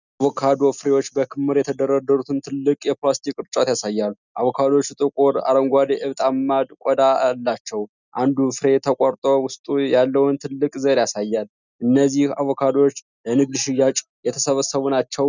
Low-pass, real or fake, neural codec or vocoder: 7.2 kHz; real; none